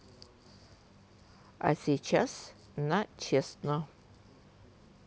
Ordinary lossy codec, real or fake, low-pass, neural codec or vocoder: none; real; none; none